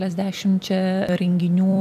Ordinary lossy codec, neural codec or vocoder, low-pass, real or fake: AAC, 96 kbps; vocoder, 44.1 kHz, 128 mel bands every 256 samples, BigVGAN v2; 14.4 kHz; fake